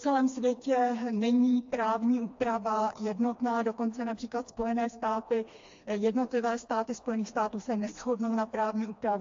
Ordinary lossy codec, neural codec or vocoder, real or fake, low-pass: AAC, 48 kbps; codec, 16 kHz, 2 kbps, FreqCodec, smaller model; fake; 7.2 kHz